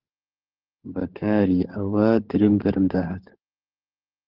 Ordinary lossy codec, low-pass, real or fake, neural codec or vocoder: Opus, 16 kbps; 5.4 kHz; fake; codec, 16 kHz, 16 kbps, FunCodec, trained on LibriTTS, 50 frames a second